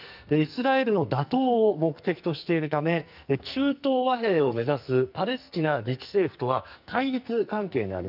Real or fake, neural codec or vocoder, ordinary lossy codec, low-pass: fake; codec, 44.1 kHz, 2.6 kbps, SNAC; none; 5.4 kHz